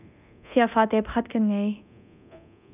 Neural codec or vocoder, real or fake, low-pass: codec, 24 kHz, 0.9 kbps, DualCodec; fake; 3.6 kHz